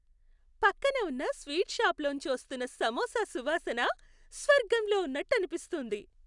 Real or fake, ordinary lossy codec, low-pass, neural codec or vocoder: real; none; 10.8 kHz; none